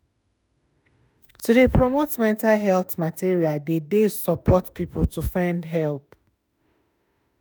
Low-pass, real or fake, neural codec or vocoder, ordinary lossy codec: none; fake; autoencoder, 48 kHz, 32 numbers a frame, DAC-VAE, trained on Japanese speech; none